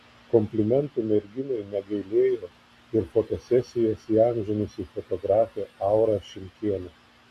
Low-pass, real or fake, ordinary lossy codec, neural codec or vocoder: 14.4 kHz; real; AAC, 64 kbps; none